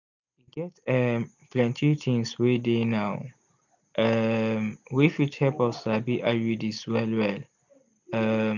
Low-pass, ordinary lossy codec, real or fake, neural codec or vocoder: 7.2 kHz; none; real; none